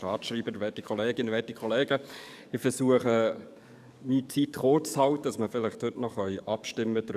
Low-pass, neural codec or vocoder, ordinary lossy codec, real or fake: 14.4 kHz; codec, 44.1 kHz, 7.8 kbps, DAC; none; fake